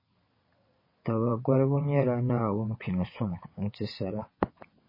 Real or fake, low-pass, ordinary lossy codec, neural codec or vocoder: fake; 5.4 kHz; MP3, 24 kbps; vocoder, 22.05 kHz, 80 mel bands, WaveNeXt